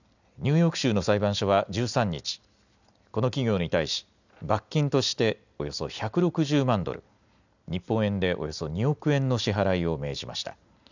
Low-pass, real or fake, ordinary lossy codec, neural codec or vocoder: 7.2 kHz; real; none; none